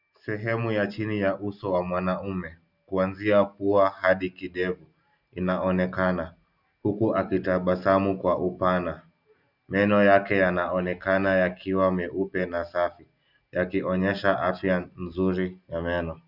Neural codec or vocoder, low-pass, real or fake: none; 5.4 kHz; real